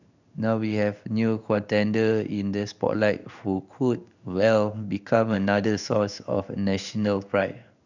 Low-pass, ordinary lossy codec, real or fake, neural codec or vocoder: 7.2 kHz; none; fake; codec, 16 kHz in and 24 kHz out, 1 kbps, XY-Tokenizer